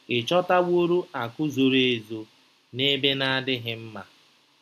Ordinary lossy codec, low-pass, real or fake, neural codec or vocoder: AAC, 96 kbps; 14.4 kHz; real; none